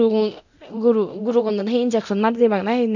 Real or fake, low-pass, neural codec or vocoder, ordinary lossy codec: fake; 7.2 kHz; codec, 24 kHz, 0.9 kbps, DualCodec; none